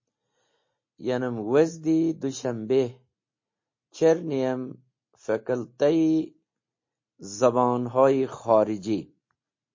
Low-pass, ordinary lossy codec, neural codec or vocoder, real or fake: 7.2 kHz; MP3, 32 kbps; none; real